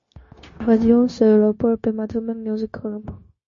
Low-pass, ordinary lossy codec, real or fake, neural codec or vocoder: 7.2 kHz; MP3, 32 kbps; fake; codec, 16 kHz, 0.9 kbps, LongCat-Audio-Codec